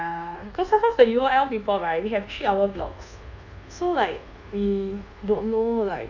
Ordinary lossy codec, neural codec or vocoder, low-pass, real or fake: none; codec, 24 kHz, 1.2 kbps, DualCodec; 7.2 kHz; fake